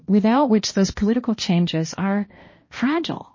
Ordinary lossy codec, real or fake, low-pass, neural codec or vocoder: MP3, 32 kbps; fake; 7.2 kHz; codec, 16 kHz, 1 kbps, X-Codec, HuBERT features, trained on balanced general audio